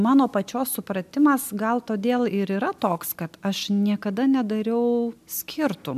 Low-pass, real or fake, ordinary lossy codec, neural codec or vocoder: 14.4 kHz; real; MP3, 96 kbps; none